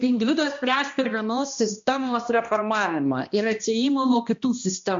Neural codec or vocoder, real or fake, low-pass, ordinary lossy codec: codec, 16 kHz, 1 kbps, X-Codec, HuBERT features, trained on balanced general audio; fake; 7.2 kHz; MP3, 48 kbps